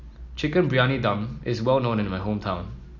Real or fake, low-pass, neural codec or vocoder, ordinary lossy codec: real; 7.2 kHz; none; none